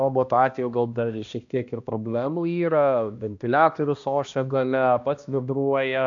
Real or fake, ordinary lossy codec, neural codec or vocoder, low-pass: fake; AAC, 64 kbps; codec, 16 kHz, 1 kbps, X-Codec, HuBERT features, trained on balanced general audio; 7.2 kHz